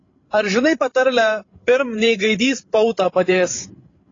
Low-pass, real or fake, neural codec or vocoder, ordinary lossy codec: 7.2 kHz; fake; codec, 16 kHz, 16 kbps, FreqCodec, larger model; AAC, 32 kbps